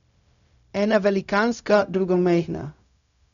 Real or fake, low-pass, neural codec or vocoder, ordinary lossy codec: fake; 7.2 kHz; codec, 16 kHz, 0.4 kbps, LongCat-Audio-Codec; none